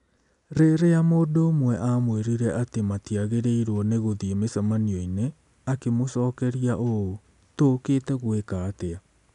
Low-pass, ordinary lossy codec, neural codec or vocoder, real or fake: 10.8 kHz; none; none; real